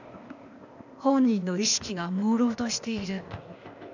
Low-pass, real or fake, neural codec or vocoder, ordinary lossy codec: 7.2 kHz; fake; codec, 16 kHz, 0.8 kbps, ZipCodec; none